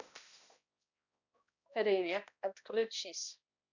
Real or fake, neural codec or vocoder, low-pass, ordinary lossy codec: fake; codec, 16 kHz, 1 kbps, X-Codec, HuBERT features, trained on balanced general audio; 7.2 kHz; none